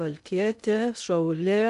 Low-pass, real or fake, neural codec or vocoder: 10.8 kHz; fake; codec, 16 kHz in and 24 kHz out, 0.6 kbps, FocalCodec, streaming, 2048 codes